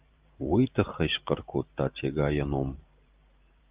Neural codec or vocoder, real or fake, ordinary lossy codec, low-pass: vocoder, 44.1 kHz, 128 mel bands every 512 samples, BigVGAN v2; fake; Opus, 24 kbps; 3.6 kHz